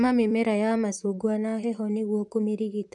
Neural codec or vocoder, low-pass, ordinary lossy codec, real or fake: vocoder, 44.1 kHz, 128 mel bands, Pupu-Vocoder; 10.8 kHz; none; fake